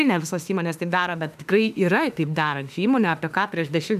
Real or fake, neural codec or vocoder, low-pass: fake; autoencoder, 48 kHz, 32 numbers a frame, DAC-VAE, trained on Japanese speech; 14.4 kHz